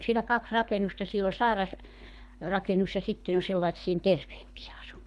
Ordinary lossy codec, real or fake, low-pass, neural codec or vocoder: none; fake; none; codec, 24 kHz, 3 kbps, HILCodec